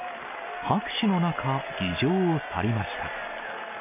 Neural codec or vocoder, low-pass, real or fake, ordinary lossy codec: none; 3.6 kHz; real; none